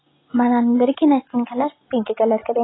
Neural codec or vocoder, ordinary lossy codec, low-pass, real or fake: none; AAC, 16 kbps; 7.2 kHz; real